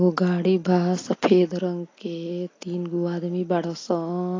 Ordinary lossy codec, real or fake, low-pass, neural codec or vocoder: AAC, 32 kbps; real; 7.2 kHz; none